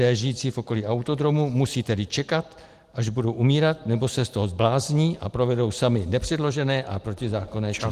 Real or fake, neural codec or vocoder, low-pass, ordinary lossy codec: real; none; 14.4 kHz; Opus, 24 kbps